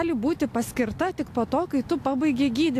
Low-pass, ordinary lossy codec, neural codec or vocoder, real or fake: 14.4 kHz; AAC, 64 kbps; none; real